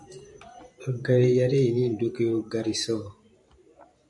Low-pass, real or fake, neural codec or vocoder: 10.8 kHz; fake; vocoder, 44.1 kHz, 128 mel bands every 512 samples, BigVGAN v2